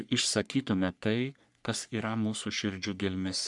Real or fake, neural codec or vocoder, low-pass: fake; codec, 44.1 kHz, 3.4 kbps, Pupu-Codec; 10.8 kHz